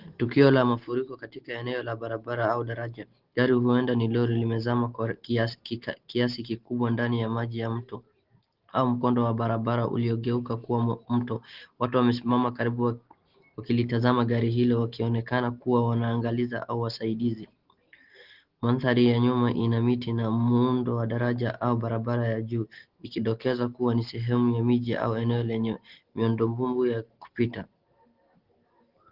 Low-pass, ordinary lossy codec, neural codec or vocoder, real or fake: 5.4 kHz; Opus, 16 kbps; none; real